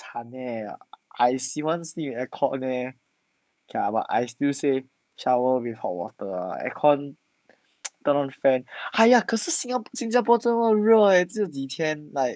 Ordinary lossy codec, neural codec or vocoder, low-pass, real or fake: none; codec, 16 kHz, 6 kbps, DAC; none; fake